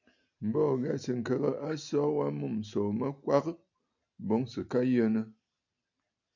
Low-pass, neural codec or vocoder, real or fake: 7.2 kHz; none; real